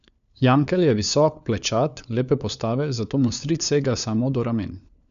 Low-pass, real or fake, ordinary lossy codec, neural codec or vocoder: 7.2 kHz; fake; none; codec, 16 kHz, 4 kbps, FunCodec, trained on LibriTTS, 50 frames a second